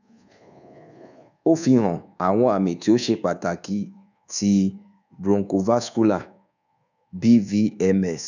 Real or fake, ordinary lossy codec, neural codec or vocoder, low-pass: fake; none; codec, 24 kHz, 1.2 kbps, DualCodec; 7.2 kHz